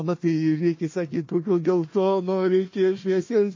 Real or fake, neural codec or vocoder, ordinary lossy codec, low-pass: fake; codec, 16 kHz, 1 kbps, FunCodec, trained on Chinese and English, 50 frames a second; MP3, 32 kbps; 7.2 kHz